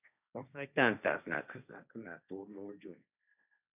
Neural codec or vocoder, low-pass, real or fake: codec, 16 kHz, 1.1 kbps, Voila-Tokenizer; 3.6 kHz; fake